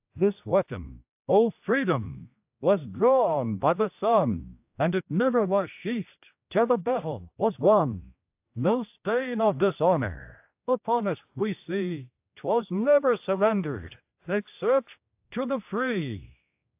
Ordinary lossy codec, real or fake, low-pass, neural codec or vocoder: AAC, 32 kbps; fake; 3.6 kHz; codec, 16 kHz, 1 kbps, X-Codec, HuBERT features, trained on general audio